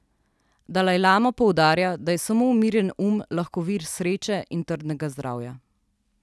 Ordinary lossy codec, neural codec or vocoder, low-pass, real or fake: none; none; none; real